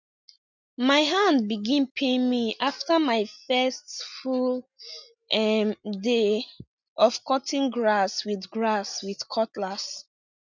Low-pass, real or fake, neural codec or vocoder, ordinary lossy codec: 7.2 kHz; real; none; none